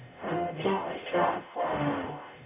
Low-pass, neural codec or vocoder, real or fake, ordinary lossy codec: 3.6 kHz; codec, 44.1 kHz, 0.9 kbps, DAC; fake; none